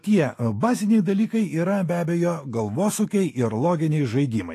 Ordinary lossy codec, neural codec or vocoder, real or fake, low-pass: AAC, 48 kbps; autoencoder, 48 kHz, 128 numbers a frame, DAC-VAE, trained on Japanese speech; fake; 14.4 kHz